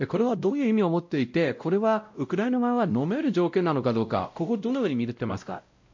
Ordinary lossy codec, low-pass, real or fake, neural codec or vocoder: MP3, 48 kbps; 7.2 kHz; fake; codec, 16 kHz, 0.5 kbps, X-Codec, WavLM features, trained on Multilingual LibriSpeech